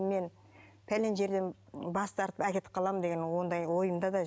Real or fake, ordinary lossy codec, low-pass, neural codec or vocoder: real; none; none; none